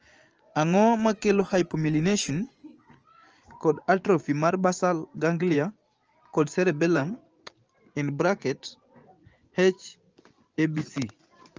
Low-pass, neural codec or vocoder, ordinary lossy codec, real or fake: 7.2 kHz; vocoder, 24 kHz, 100 mel bands, Vocos; Opus, 24 kbps; fake